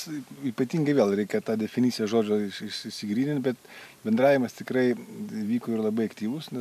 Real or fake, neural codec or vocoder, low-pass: real; none; 14.4 kHz